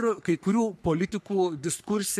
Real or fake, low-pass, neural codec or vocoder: fake; 14.4 kHz; codec, 44.1 kHz, 3.4 kbps, Pupu-Codec